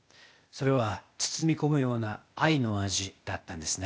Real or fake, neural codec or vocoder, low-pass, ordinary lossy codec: fake; codec, 16 kHz, 0.8 kbps, ZipCodec; none; none